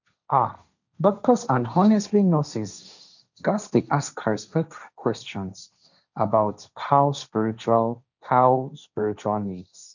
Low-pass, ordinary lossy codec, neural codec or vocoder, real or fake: 7.2 kHz; none; codec, 16 kHz, 1.1 kbps, Voila-Tokenizer; fake